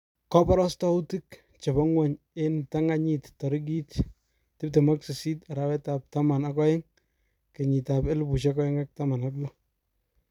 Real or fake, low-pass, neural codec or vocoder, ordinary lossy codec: fake; 19.8 kHz; vocoder, 44.1 kHz, 128 mel bands every 256 samples, BigVGAN v2; none